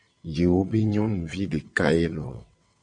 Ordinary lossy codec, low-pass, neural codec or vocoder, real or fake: MP3, 48 kbps; 9.9 kHz; vocoder, 22.05 kHz, 80 mel bands, WaveNeXt; fake